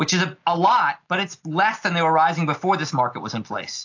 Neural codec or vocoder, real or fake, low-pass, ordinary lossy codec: none; real; 7.2 kHz; AAC, 48 kbps